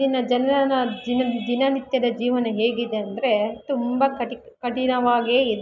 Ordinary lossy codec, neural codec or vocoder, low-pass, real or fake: none; none; 7.2 kHz; real